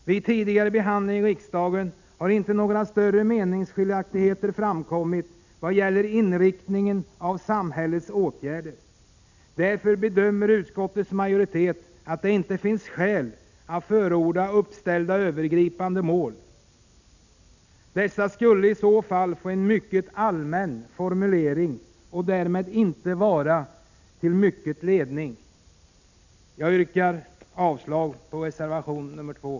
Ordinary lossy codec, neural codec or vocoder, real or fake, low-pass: none; none; real; 7.2 kHz